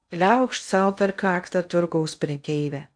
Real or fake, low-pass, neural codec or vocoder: fake; 9.9 kHz; codec, 16 kHz in and 24 kHz out, 0.6 kbps, FocalCodec, streaming, 2048 codes